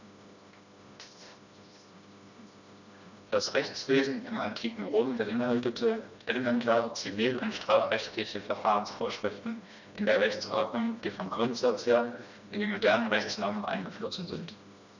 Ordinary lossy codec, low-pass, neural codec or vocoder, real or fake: none; 7.2 kHz; codec, 16 kHz, 1 kbps, FreqCodec, smaller model; fake